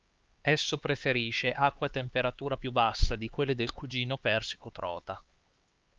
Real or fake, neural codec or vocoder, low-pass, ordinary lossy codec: fake; codec, 16 kHz, 4 kbps, X-Codec, HuBERT features, trained on LibriSpeech; 7.2 kHz; Opus, 24 kbps